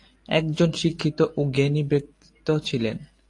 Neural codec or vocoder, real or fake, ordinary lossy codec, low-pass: none; real; AAC, 48 kbps; 10.8 kHz